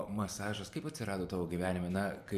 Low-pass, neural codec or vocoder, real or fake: 14.4 kHz; vocoder, 44.1 kHz, 128 mel bands every 512 samples, BigVGAN v2; fake